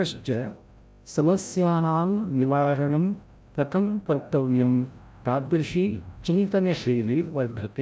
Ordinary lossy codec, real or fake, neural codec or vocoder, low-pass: none; fake; codec, 16 kHz, 0.5 kbps, FreqCodec, larger model; none